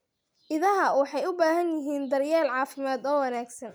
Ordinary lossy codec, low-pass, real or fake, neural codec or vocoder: none; none; real; none